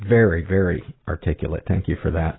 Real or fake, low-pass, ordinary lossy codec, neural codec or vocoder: real; 7.2 kHz; AAC, 16 kbps; none